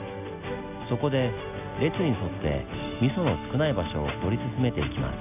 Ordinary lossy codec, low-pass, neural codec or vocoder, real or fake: none; 3.6 kHz; none; real